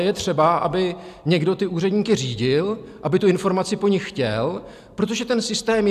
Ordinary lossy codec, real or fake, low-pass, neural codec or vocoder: AAC, 96 kbps; real; 14.4 kHz; none